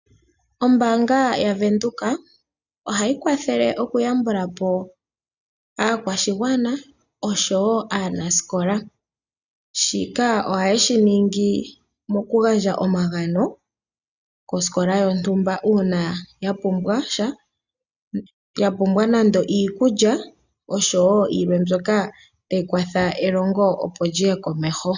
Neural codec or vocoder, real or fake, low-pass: none; real; 7.2 kHz